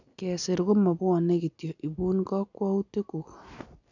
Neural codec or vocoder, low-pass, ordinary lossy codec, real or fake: none; 7.2 kHz; none; real